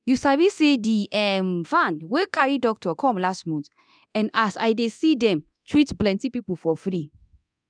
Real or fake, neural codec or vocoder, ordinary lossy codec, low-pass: fake; codec, 24 kHz, 0.9 kbps, DualCodec; none; 9.9 kHz